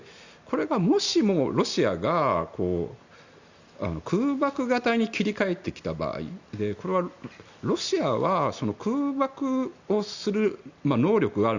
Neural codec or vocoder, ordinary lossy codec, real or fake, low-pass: none; Opus, 64 kbps; real; 7.2 kHz